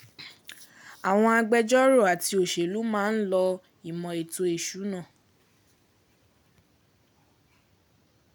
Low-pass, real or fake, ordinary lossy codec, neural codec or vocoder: none; real; none; none